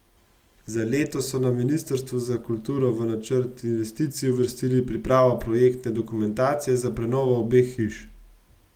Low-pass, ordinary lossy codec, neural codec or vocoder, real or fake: 19.8 kHz; Opus, 24 kbps; none; real